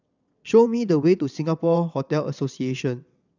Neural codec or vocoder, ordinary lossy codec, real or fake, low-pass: vocoder, 22.05 kHz, 80 mel bands, WaveNeXt; none; fake; 7.2 kHz